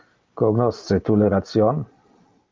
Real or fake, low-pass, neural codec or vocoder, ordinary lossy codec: real; 7.2 kHz; none; Opus, 24 kbps